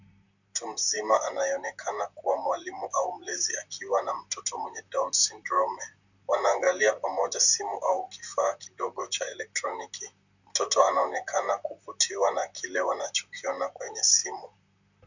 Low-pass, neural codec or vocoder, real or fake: 7.2 kHz; vocoder, 44.1 kHz, 128 mel bands, Pupu-Vocoder; fake